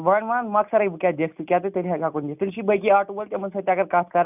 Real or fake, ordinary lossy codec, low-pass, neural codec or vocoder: real; none; 3.6 kHz; none